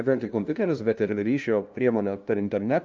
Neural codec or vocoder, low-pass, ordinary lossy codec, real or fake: codec, 16 kHz, 0.5 kbps, FunCodec, trained on LibriTTS, 25 frames a second; 7.2 kHz; Opus, 32 kbps; fake